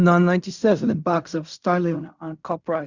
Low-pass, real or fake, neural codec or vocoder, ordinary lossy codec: 7.2 kHz; fake; codec, 16 kHz in and 24 kHz out, 0.4 kbps, LongCat-Audio-Codec, fine tuned four codebook decoder; Opus, 64 kbps